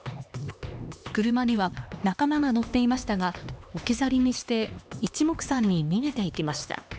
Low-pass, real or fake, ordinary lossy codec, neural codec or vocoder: none; fake; none; codec, 16 kHz, 2 kbps, X-Codec, HuBERT features, trained on LibriSpeech